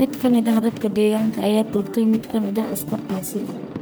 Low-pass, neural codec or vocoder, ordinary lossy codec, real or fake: none; codec, 44.1 kHz, 1.7 kbps, Pupu-Codec; none; fake